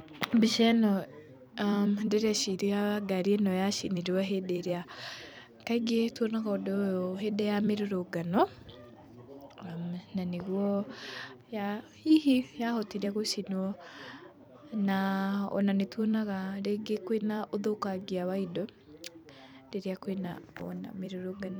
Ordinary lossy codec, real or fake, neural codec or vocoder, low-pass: none; fake; vocoder, 44.1 kHz, 128 mel bands every 256 samples, BigVGAN v2; none